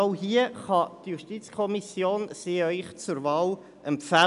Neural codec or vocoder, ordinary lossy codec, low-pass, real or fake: none; none; 10.8 kHz; real